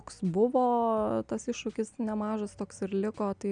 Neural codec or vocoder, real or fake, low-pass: none; real; 9.9 kHz